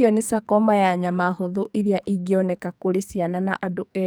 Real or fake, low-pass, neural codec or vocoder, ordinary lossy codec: fake; none; codec, 44.1 kHz, 2.6 kbps, SNAC; none